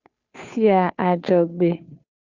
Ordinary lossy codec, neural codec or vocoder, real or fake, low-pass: Opus, 64 kbps; codec, 16 kHz, 2 kbps, FunCodec, trained on Chinese and English, 25 frames a second; fake; 7.2 kHz